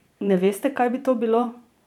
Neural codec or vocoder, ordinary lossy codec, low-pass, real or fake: vocoder, 44.1 kHz, 128 mel bands every 512 samples, BigVGAN v2; none; 19.8 kHz; fake